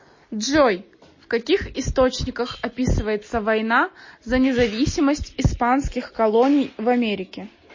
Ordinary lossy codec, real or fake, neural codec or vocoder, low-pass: MP3, 32 kbps; real; none; 7.2 kHz